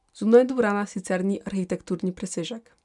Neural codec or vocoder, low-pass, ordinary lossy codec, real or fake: none; 10.8 kHz; none; real